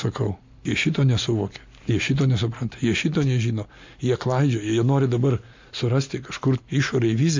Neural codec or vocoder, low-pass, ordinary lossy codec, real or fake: none; 7.2 kHz; MP3, 64 kbps; real